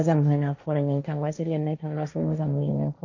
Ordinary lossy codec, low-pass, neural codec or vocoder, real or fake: none; 7.2 kHz; codec, 16 kHz, 1.1 kbps, Voila-Tokenizer; fake